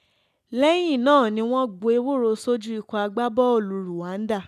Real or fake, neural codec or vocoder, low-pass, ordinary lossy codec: real; none; 14.4 kHz; none